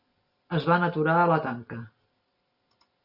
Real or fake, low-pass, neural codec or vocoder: real; 5.4 kHz; none